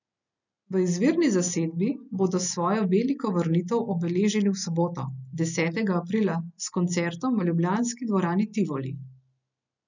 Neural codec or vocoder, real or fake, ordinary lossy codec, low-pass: none; real; none; 7.2 kHz